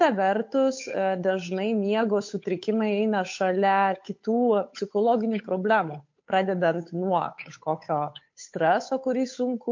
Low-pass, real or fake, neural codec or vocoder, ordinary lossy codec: 7.2 kHz; fake; codec, 16 kHz, 8 kbps, FunCodec, trained on Chinese and English, 25 frames a second; MP3, 48 kbps